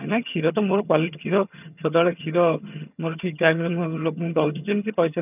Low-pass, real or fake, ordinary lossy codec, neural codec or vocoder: 3.6 kHz; fake; none; vocoder, 22.05 kHz, 80 mel bands, HiFi-GAN